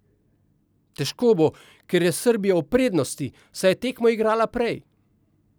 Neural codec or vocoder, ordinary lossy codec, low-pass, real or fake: none; none; none; real